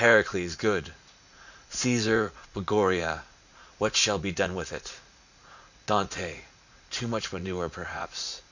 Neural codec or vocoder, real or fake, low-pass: vocoder, 44.1 kHz, 80 mel bands, Vocos; fake; 7.2 kHz